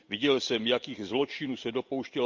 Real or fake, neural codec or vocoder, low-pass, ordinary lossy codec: real; none; 7.2 kHz; Opus, 32 kbps